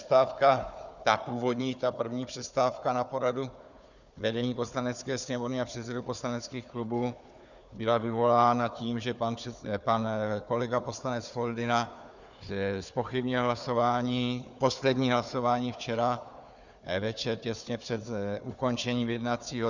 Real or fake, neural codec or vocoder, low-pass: fake; codec, 16 kHz, 4 kbps, FunCodec, trained on Chinese and English, 50 frames a second; 7.2 kHz